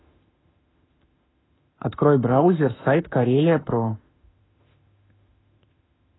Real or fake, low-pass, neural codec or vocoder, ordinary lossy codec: fake; 7.2 kHz; autoencoder, 48 kHz, 32 numbers a frame, DAC-VAE, trained on Japanese speech; AAC, 16 kbps